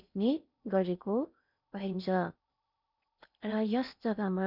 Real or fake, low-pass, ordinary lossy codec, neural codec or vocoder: fake; 5.4 kHz; none; codec, 16 kHz in and 24 kHz out, 0.6 kbps, FocalCodec, streaming, 4096 codes